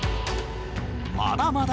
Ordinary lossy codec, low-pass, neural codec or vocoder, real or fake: none; none; none; real